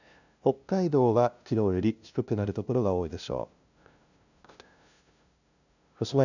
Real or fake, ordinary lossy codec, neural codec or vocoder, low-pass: fake; none; codec, 16 kHz, 0.5 kbps, FunCodec, trained on LibriTTS, 25 frames a second; 7.2 kHz